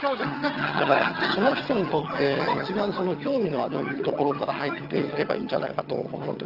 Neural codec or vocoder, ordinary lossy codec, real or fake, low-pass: vocoder, 22.05 kHz, 80 mel bands, HiFi-GAN; Opus, 24 kbps; fake; 5.4 kHz